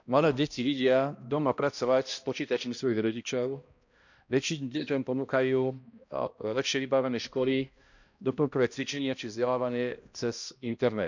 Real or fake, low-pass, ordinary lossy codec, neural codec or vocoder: fake; 7.2 kHz; none; codec, 16 kHz, 1 kbps, X-Codec, HuBERT features, trained on balanced general audio